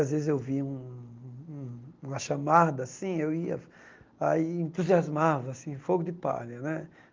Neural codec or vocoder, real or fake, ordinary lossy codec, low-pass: none; real; Opus, 32 kbps; 7.2 kHz